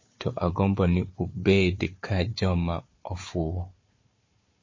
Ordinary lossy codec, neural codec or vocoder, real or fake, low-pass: MP3, 32 kbps; codec, 16 kHz, 4 kbps, FunCodec, trained on Chinese and English, 50 frames a second; fake; 7.2 kHz